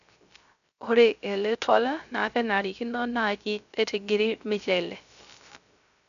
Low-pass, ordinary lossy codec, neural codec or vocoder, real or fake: 7.2 kHz; none; codec, 16 kHz, 0.3 kbps, FocalCodec; fake